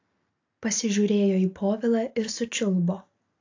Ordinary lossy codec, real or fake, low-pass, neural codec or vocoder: AAC, 48 kbps; real; 7.2 kHz; none